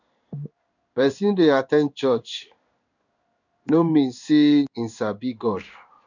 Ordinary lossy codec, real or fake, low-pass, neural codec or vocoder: none; fake; 7.2 kHz; codec, 16 kHz in and 24 kHz out, 1 kbps, XY-Tokenizer